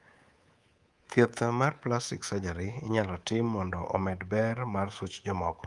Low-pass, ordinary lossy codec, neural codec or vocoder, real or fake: 10.8 kHz; Opus, 32 kbps; codec, 24 kHz, 3.1 kbps, DualCodec; fake